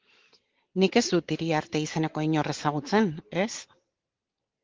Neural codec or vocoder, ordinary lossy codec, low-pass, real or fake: none; Opus, 16 kbps; 7.2 kHz; real